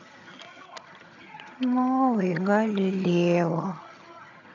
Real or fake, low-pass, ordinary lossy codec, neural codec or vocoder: fake; 7.2 kHz; none; vocoder, 22.05 kHz, 80 mel bands, HiFi-GAN